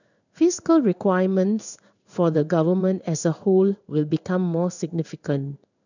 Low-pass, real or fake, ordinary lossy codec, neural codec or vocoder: 7.2 kHz; fake; none; codec, 16 kHz in and 24 kHz out, 1 kbps, XY-Tokenizer